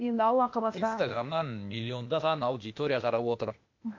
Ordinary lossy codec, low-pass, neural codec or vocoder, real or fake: MP3, 48 kbps; 7.2 kHz; codec, 16 kHz, 0.8 kbps, ZipCodec; fake